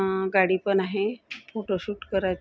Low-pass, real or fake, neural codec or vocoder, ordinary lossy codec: none; real; none; none